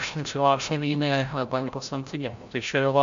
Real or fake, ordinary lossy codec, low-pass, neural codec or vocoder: fake; MP3, 64 kbps; 7.2 kHz; codec, 16 kHz, 0.5 kbps, FreqCodec, larger model